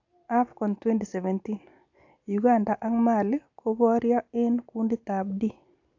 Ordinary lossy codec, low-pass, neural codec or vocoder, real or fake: AAC, 48 kbps; 7.2 kHz; none; real